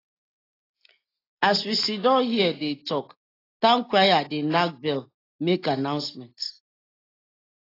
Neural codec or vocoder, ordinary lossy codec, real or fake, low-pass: none; AAC, 24 kbps; real; 5.4 kHz